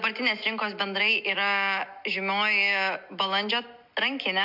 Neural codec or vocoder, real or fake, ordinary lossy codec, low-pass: none; real; MP3, 48 kbps; 5.4 kHz